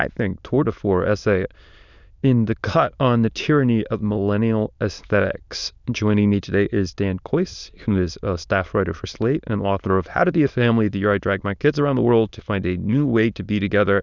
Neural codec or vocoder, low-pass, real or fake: autoencoder, 22.05 kHz, a latent of 192 numbers a frame, VITS, trained on many speakers; 7.2 kHz; fake